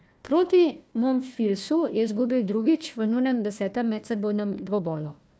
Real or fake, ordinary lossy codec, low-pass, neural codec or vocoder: fake; none; none; codec, 16 kHz, 1 kbps, FunCodec, trained on Chinese and English, 50 frames a second